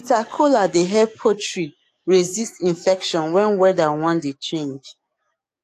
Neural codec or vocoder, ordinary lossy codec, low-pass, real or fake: codec, 44.1 kHz, 7.8 kbps, DAC; AAC, 64 kbps; 14.4 kHz; fake